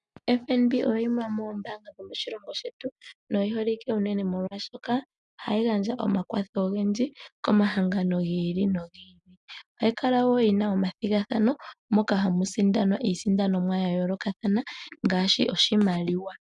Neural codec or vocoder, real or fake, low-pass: none; real; 10.8 kHz